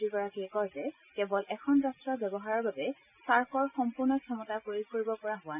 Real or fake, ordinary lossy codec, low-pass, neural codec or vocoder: real; none; 3.6 kHz; none